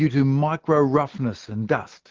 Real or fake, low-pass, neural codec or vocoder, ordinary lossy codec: real; 7.2 kHz; none; Opus, 16 kbps